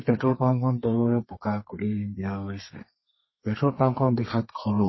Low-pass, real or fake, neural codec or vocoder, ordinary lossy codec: 7.2 kHz; fake; codec, 32 kHz, 1.9 kbps, SNAC; MP3, 24 kbps